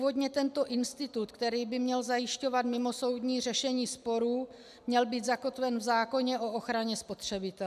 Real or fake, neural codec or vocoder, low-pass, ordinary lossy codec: real; none; 14.4 kHz; AAC, 96 kbps